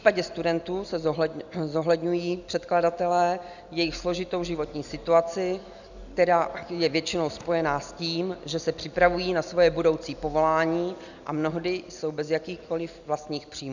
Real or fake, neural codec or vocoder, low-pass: real; none; 7.2 kHz